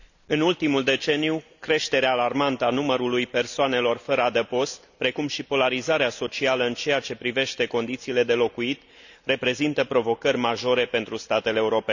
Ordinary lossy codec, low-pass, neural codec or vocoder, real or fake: none; 7.2 kHz; none; real